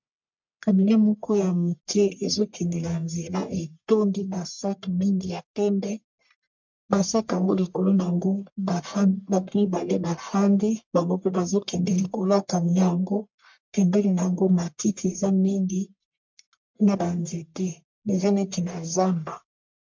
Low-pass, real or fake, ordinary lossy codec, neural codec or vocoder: 7.2 kHz; fake; MP3, 64 kbps; codec, 44.1 kHz, 1.7 kbps, Pupu-Codec